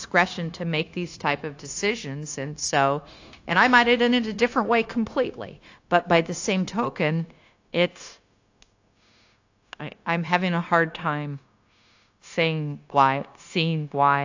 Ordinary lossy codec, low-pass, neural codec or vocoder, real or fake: AAC, 48 kbps; 7.2 kHz; codec, 16 kHz, 0.9 kbps, LongCat-Audio-Codec; fake